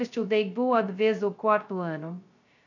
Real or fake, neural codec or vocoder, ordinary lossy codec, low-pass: fake; codec, 16 kHz, 0.2 kbps, FocalCodec; none; 7.2 kHz